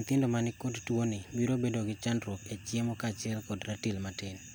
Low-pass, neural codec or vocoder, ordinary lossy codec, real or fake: none; none; none; real